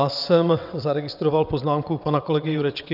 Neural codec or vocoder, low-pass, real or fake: vocoder, 22.05 kHz, 80 mel bands, WaveNeXt; 5.4 kHz; fake